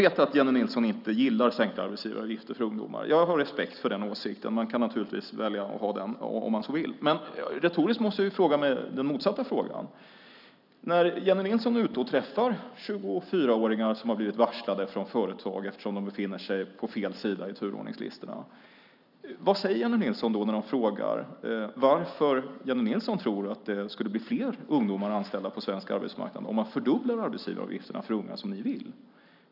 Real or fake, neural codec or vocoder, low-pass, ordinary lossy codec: real; none; 5.4 kHz; none